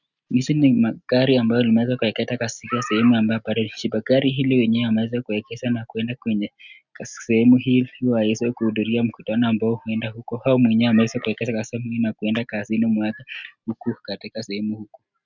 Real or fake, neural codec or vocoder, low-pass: real; none; 7.2 kHz